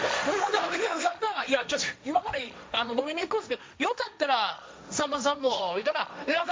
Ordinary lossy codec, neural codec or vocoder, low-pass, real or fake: none; codec, 16 kHz, 1.1 kbps, Voila-Tokenizer; none; fake